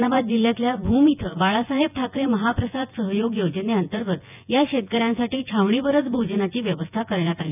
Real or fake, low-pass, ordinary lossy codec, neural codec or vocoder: fake; 3.6 kHz; none; vocoder, 24 kHz, 100 mel bands, Vocos